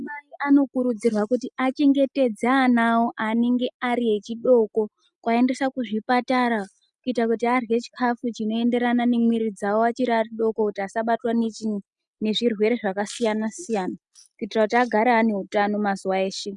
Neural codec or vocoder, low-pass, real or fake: none; 10.8 kHz; real